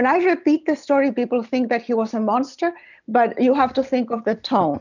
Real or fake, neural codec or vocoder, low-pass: real; none; 7.2 kHz